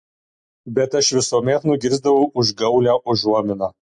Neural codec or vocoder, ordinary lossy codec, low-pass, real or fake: none; MP3, 48 kbps; 9.9 kHz; real